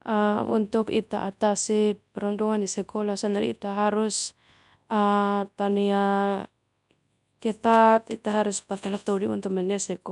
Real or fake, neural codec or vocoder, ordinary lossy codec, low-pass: fake; codec, 24 kHz, 0.9 kbps, WavTokenizer, large speech release; none; 10.8 kHz